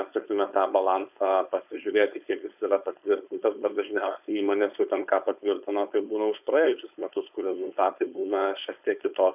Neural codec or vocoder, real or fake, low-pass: codec, 16 kHz, 4.8 kbps, FACodec; fake; 3.6 kHz